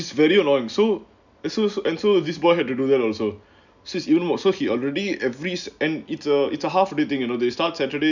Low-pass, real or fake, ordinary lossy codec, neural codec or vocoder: 7.2 kHz; real; none; none